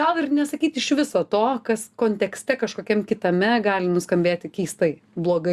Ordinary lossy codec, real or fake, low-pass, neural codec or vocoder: Opus, 64 kbps; real; 14.4 kHz; none